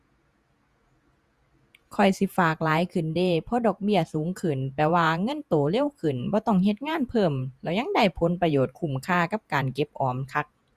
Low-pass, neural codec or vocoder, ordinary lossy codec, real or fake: 14.4 kHz; vocoder, 48 kHz, 128 mel bands, Vocos; Opus, 64 kbps; fake